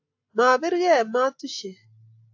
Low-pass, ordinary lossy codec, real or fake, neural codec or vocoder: 7.2 kHz; AAC, 48 kbps; real; none